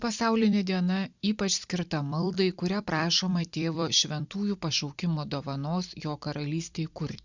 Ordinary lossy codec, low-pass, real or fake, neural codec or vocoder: Opus, 64 kbps; 7.2 kHz; fake; vocoder, 44.1 kHz, 128 mel bands every 256 samples, BigVGAN v2